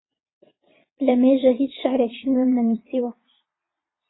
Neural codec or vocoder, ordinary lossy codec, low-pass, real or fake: vocoder, 22.05 kHz, 80 mel bands, Vocos; AAC, 16 kbps; 7.2 kHz; fake